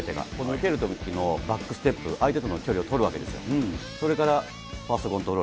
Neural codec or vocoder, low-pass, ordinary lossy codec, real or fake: none; none; none; real